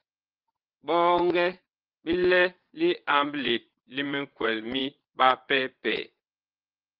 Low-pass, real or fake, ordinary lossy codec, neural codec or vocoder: 5.4 kHz; fake; Opus, 32 kbps; vocoder, 22.05 kHz, 80 mel bands, WaveNeXt